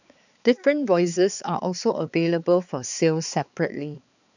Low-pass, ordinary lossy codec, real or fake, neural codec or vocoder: 7.2 kHz; none; fake; codec, 16 kHz, 4 kbps, X-Codec, HuBERT features, trained on balanced general audio